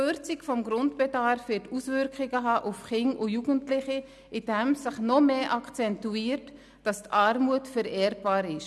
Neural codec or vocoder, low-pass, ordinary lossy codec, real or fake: none; none; none; real